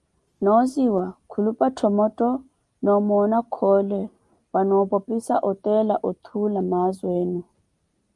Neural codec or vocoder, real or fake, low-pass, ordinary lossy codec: none; real; 10.8 kHz; Opus, 32 kbps